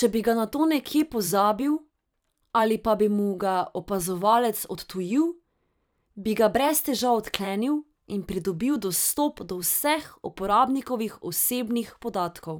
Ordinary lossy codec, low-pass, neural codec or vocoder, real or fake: none; none; vocoder, 44.1 kHz, 128 mel bands every 512 samples, BigVGAN v2; fake